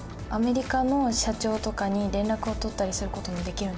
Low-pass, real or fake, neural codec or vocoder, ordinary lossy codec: none; real; none; none